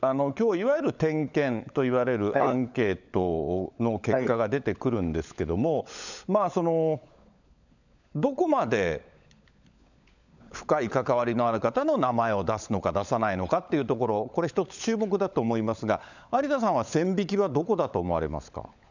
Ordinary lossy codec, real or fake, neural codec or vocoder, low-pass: none; fake; codec, 16 kHz, 16 kbps, FunCodec, trained on LibriTTS, 50 frames a second; 7.2 kHz